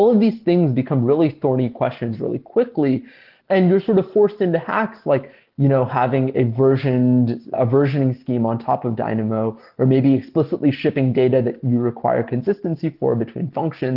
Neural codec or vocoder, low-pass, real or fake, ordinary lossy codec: none; 5.4 kHz; real; Opus, 16 kbps